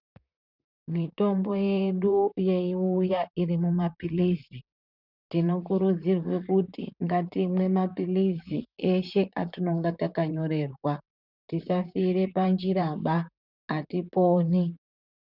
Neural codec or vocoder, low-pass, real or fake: vocoder, 44.1 kHz, 128 mel bands, Pupu-Vocoder; 5.4 kHz; fake